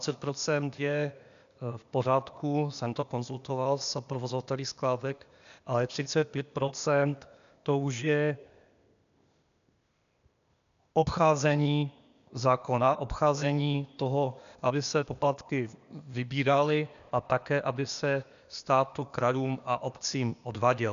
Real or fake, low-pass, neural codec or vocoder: fake; 7.2 kHz; codec, 16 kHz, 0.8 kbps, ZipCodec